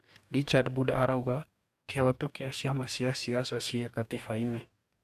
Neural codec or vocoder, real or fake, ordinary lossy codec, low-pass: codec, 44.1 kHz, 2.6 kbps, DAC; fake; none; 14.4 kHz